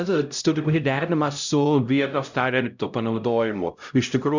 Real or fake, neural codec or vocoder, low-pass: fake; codec, 16 kHz, 0.5 kbps, X-Codec, HuBERT features, trained on LibriSpeech; 7.2 kHz